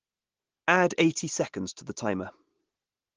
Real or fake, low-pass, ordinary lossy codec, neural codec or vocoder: real; 7.2 kHz; Opus, 16 kbps; none